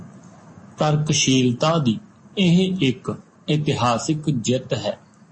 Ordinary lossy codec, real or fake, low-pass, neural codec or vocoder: MP3, 32 kbps; real; 9.9 kHz; none